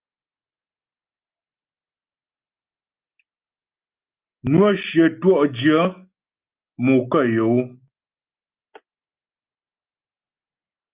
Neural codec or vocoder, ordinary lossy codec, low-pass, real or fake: none; Opus, 32 kbps; 3.6 kHz; real